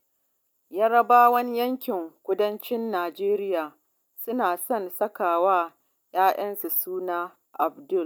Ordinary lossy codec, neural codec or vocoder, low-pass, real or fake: none; none; none; real